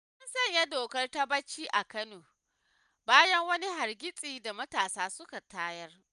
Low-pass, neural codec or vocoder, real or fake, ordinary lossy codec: 10.8 kHz; none; real; none